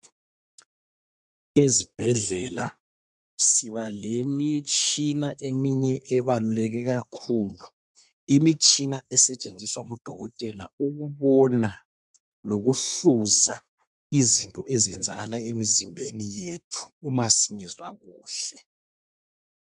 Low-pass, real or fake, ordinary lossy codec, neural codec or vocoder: 10.8 kHz; fake; MP3, 96 kbps; codec, 24 kHz, 1 kbps, SNAC